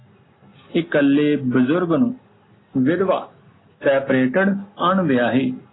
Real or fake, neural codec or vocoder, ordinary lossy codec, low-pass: real; none; AAC, 16 kbps; 7.2 kHz